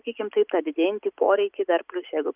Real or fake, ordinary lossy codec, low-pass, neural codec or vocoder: real; Opus, 24 kbps; 3.6 kHz; none